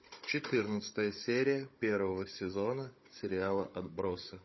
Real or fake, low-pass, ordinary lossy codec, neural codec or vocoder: fake; 7.2 kHz; MP3, 24 kbps; codec, 16 kHz, 16 kbps, FunCodec, trained on Chinese and English, 50 frames a second